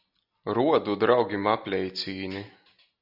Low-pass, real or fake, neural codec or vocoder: 5.4 kHz; real; none